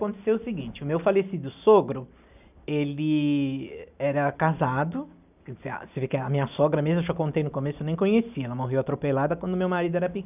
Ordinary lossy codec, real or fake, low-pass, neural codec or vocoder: none; real; 3.6 kHz; none